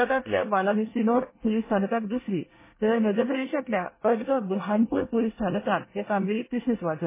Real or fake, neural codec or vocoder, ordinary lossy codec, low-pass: fake; codec, 24 kHz, 1 kbps, SNAC; MP3, 16 kbps; 3.6 kHz